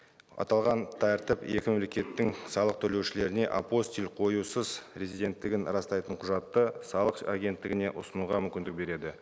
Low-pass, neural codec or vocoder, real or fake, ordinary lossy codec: none; none; real; none